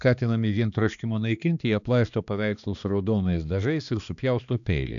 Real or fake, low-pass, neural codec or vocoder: fake; 7.2 kHz; codec, 16 kHz, 2 kbps, X-Codec, HuBERT features, trained on balanced general audio